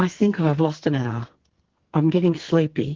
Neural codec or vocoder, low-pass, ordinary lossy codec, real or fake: codec, 44.1 kHz, 2.6 kbps, SNAC; 7.2 kHz; Opus, 16 kbps; fake